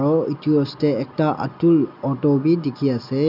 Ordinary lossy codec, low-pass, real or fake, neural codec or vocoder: none; 5.4 kHz; real; none